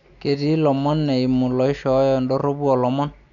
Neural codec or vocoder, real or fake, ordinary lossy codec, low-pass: none; real; none; 7.2 kHz